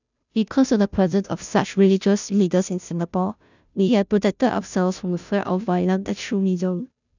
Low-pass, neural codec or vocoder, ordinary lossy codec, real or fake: 7.2 kHz; codec, 16 kHz, 0.5 kbps, FunCodec, trained on Chinese and English, 25 frames a second; none; fake